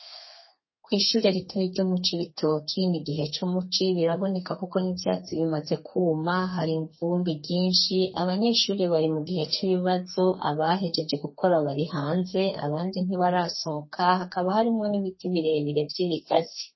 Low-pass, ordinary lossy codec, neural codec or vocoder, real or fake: 7.2 kHz; MP3, 24 kbps; codec, 32 kHz, 1.9 kbps, SNAC; fake